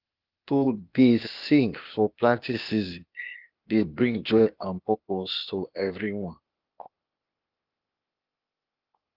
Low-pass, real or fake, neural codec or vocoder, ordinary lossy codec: 5.4 kHz; fake; codec, 16 kHz, 0.8 kbps, ZipCodec; Opus, 32 kbps